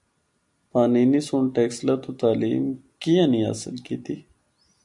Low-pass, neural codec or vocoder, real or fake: 10.8 kHz; none; real